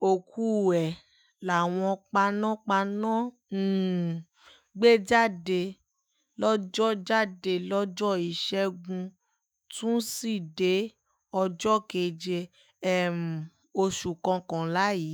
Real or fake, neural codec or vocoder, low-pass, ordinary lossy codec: fake; autoencoder, 48 kHz, 128 numbers a frame, DAC-VAE, trained on Japanese speech; none; none